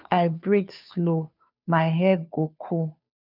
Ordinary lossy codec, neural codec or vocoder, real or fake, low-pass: none; codec, 16 kHz, 2 kbps, FunCodec, trained on Chinese and English, 25 frames a second; fake; 5.4 kHz